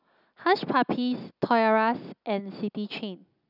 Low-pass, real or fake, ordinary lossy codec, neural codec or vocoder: 5.4 kHz; real; none; none